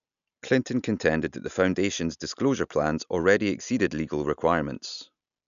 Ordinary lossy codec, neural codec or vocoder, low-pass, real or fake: none; none; 7.2 kHz; real